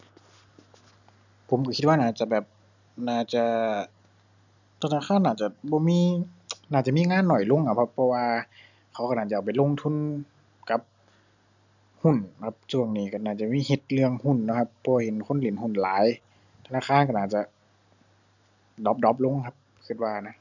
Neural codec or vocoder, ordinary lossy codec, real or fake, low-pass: none; none; real; 7.2 kHz